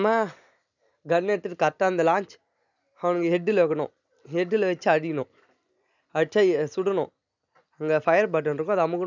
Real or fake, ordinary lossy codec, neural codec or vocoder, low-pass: real; none; none; 7.2 kHz